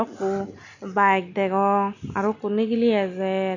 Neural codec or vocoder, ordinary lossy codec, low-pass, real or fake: none; none; 7.2 kHz; real